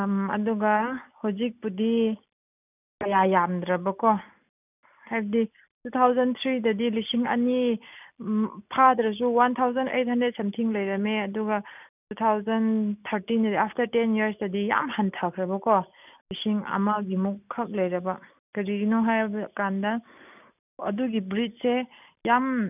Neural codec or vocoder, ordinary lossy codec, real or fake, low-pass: none; none; real; 3.6 kHz